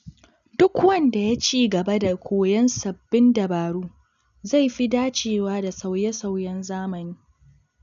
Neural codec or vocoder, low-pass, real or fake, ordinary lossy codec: none; 7.2 kHz; real; none